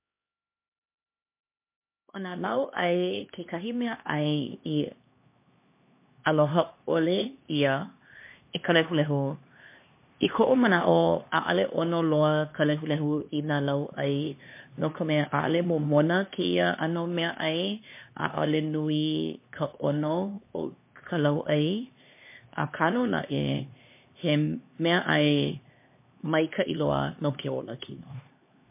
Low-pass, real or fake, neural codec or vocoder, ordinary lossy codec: 3.6 kHz; fake; codec, 16 kHz, 2 kbps, X-Codec, HuBERT features, trained on LibriSpeech; MP3, 24 kbps